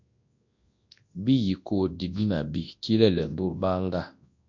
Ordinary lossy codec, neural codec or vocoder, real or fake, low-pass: MP3, 48 kbps; codec, 24 kHz, 0.9 kbps, WavTokenizer, large speech release; fake; 7.2 kHz